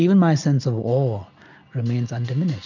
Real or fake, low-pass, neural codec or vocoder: real; 7.2 kHz; none